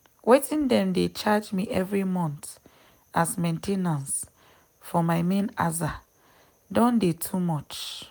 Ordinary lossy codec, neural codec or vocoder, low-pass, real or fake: none; none; none; real